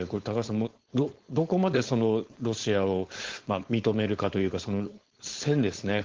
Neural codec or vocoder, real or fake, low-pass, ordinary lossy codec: codec, 16 kHz, 4.8 kbps, FACodec; fake; 7.2 kHz; Opus, 16 kbps